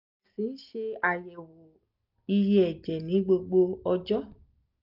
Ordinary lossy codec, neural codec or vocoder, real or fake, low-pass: none; none; real; 5.4 kHz